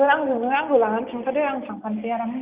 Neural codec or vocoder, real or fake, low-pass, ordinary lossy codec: none; real; 3.6 kHz; Opus, 24 kbps